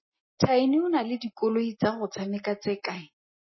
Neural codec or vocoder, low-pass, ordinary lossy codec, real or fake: none; 7.2 kHz; MP3, 24 kbps; real